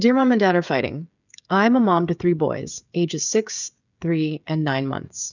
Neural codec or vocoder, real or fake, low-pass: codec, 16 kHz, 16 kbps, FreqCodec, smaller model; fake; 7.2 kHz